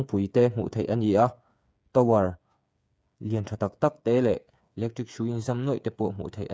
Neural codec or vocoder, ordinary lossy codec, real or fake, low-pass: codec, 16 kHz, 8 kbps, FreqCodec, smaller model; none; fake; none